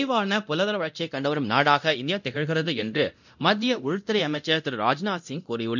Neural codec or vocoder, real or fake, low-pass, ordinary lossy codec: codec, 24 kHz, 0.9 kbps, DualCodec; fake; 7.2 kHz; none